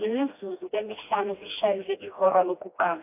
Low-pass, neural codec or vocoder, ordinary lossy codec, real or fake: 3.6 kHz; codec, 16 kHz, 1 kbps, FreqCodec, smaller model; AAC, 32 kbps; fake